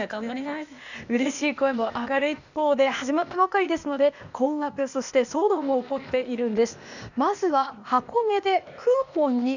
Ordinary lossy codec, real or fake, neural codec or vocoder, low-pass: none; fake; codec, 16 kHz, 0.8 kbps, ZipCodec; 7.2 kHz